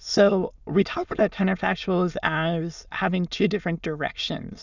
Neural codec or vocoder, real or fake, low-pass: autoencoder, 22.05 kHz, a latent of 192 numbers a frame, VITS, trained on many speakers; fake; 7.2 kHz